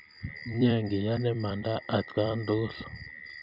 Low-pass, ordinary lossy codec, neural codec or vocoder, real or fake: 5.4 kHz; none; vocoder, 44.1 kHz, 128 mel bands every 256 samples, BigVGAN v2; fake